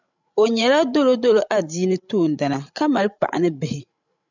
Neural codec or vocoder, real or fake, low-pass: codec, 16 kHz, 16 kbps, FreqCodec, larger model; fake; 7.2 kHz